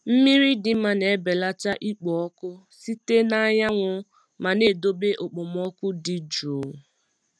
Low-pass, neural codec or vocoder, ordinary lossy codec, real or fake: none; none; none; real